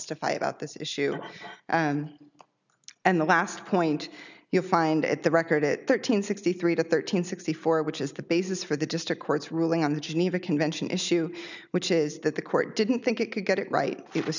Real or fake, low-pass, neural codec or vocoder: real; 7.2 kHz; none